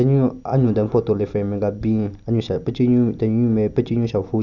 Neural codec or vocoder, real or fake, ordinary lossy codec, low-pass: none; real; none; 7.2 kHz